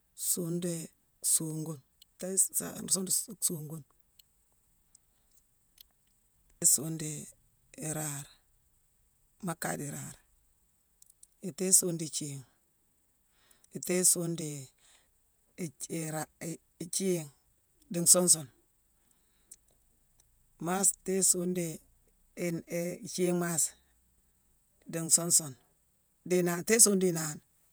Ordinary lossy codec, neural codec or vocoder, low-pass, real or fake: none; vocoder, 48 kHz, 128 mel bands, Vocos; none; fake